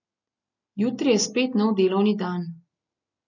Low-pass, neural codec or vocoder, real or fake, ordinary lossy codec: 7.2 kHz; none; real; none